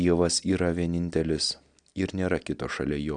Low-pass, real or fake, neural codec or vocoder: 9.9 kHz; real; none